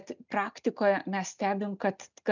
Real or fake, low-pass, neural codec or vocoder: real; 7.2 kHz; none